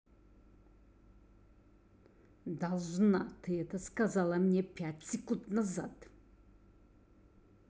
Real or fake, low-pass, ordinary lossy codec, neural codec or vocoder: real; none; none; none